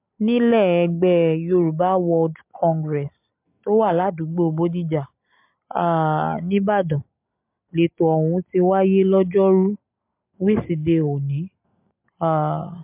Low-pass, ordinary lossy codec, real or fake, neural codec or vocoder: 3.6 kHz; MP3, 32 kbps; real; none